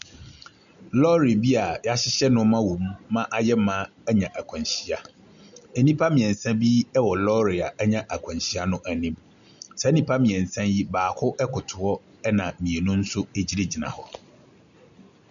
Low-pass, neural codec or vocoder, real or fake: 7.2 kHz; none; real